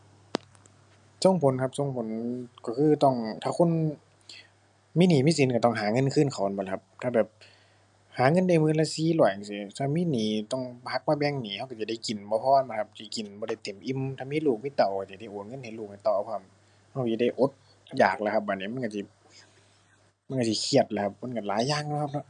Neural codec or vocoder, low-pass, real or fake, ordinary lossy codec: none; 9.9 kHz; real; none